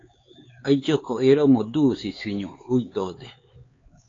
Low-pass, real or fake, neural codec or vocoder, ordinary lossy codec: 7.2 kHz; fake; codec, 16 kHz, 4 kbps, X-Codec, HuBERT features, trained on LibriSpeech; AAC, 32 kbps